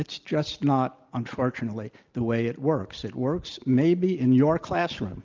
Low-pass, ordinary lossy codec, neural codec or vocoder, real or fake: 7.2 kHz; Opus, 24 kbps; none; real